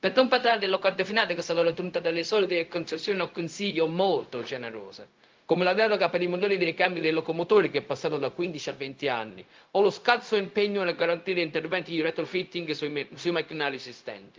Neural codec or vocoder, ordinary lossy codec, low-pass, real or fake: codec, 16 kHz, 0.4 kbps, LongCat-Audio-Codec; Opus, 32 kbps; 7.2 kHz; fake